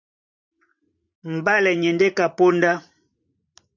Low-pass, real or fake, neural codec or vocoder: 7.2 kHz; fake; vocoder, 44.1 kHz, 128 mel bands every 512 samples, BigVGAN v2